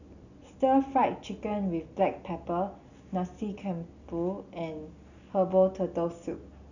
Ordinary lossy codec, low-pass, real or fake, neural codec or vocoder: none; 7.2 kHz; real; none